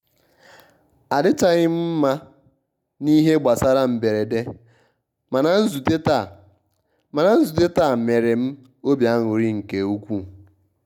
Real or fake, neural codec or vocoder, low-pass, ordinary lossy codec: real; none; 19.8 kHz; none